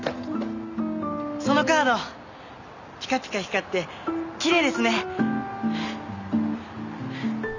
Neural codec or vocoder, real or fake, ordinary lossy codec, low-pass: none; real; none; 7.2 kHz